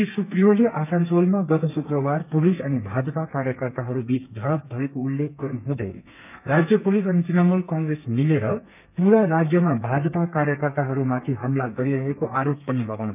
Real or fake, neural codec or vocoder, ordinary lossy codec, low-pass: fake; codec, 32 kHz, 1.9 kbps, SNAC; none; 3.6 kHz